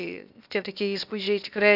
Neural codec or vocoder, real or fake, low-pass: codec, 16 kHz, 0.8 kbps, ZipCodec; fake; 5.4 kHz